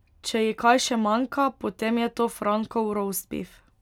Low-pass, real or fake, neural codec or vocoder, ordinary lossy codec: 19.8 kHz; real; none; none